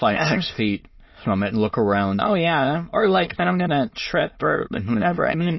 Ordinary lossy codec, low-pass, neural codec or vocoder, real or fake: MP3, 24 kbps; 7.2 kHz; autoencoder, 22.05 kHz, a latent of 192 numbers a frame, VITS, trained on many speakers; fake